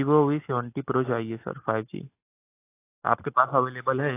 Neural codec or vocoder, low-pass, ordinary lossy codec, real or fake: none; 3.6 kHz; AAC, 24 kbps; real